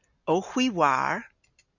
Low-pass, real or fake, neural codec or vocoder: 7.2 kHz; real; none